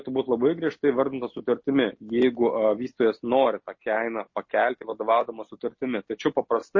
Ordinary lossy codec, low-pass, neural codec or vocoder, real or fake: MP3, 32 kbps; 7.2 kHz; none; real